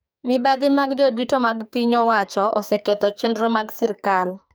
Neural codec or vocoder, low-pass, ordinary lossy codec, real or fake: codec, 44.1 kHz, 2.6 kbps, SNAC; none; none; fake